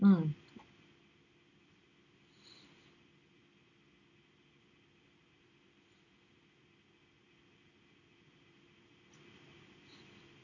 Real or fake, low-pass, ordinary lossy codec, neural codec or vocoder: real; 7.2 kHz; none; none